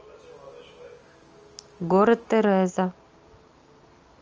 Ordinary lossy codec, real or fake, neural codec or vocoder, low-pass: Opus, 24 kbps; real; none; 7.2 kHz